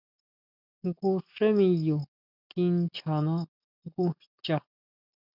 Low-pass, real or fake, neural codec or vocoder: 5.4 kHz; real; none